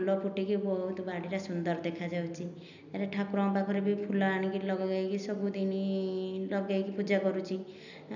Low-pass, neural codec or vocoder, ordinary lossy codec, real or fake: 7.2 kHz; none; none; real